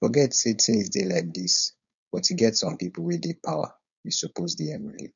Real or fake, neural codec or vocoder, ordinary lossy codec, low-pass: fake; codec, 16 kHz, 4.8 kbps, FACodec; none; 7.2 kHz